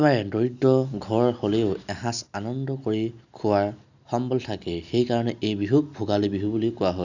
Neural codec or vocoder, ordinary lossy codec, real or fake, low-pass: none; none; real; 7.2 kHz